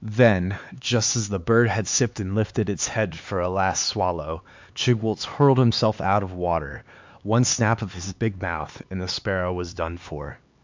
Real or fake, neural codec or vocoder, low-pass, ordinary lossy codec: fake; codec, 16 kHz, 4 kbps, X-Codec, HuBERT features, trained on LibriSpeech; 7.2 kHz; MP3, 64 kbps